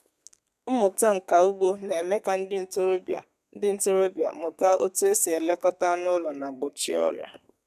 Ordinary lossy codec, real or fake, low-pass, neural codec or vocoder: none; fake; 14.4 kHz; codec, 32 kHz, 1.9 kbps, SNAC